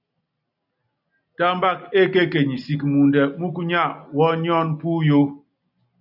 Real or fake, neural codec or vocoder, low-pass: real; none; 5.4 kHz